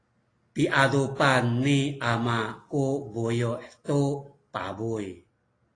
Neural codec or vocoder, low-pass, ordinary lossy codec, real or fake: none; 9.9 kHz; AAC, 32 kbps; real